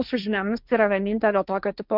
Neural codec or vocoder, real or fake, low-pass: codec, 16 kHz, 1.1 kbps, Voila-Tokenizer; fake; 5.4 kHz